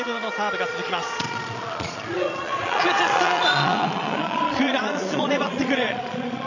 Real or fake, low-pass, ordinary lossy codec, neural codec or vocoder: fake; 7.2 kHz; none; vocoder, 22.05 kHz, 80 mel bands, Vocos